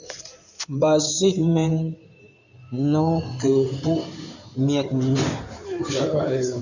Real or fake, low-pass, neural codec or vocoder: fake; 7.2 kHz; codec, 16 kHz in and 24 kHz out, 2.2 kbps, FireRedTTS-2 codec